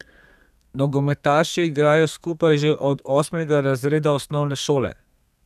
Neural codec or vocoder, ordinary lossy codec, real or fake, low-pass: codec, 32 kHz, 1.9 kbps, SNAC; none; fake; 14.4 kHz